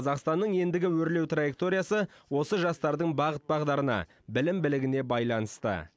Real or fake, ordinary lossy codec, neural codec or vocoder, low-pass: real; none; none; none